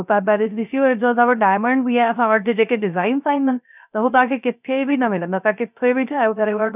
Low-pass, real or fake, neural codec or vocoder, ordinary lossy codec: 3.6 kHz; fake; codec, 16 kHz, 0.3 kbps, FocalCodec; none